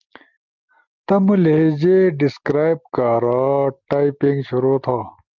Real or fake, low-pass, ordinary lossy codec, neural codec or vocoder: real; 7.2 kHz; Opus, 24 kbps; none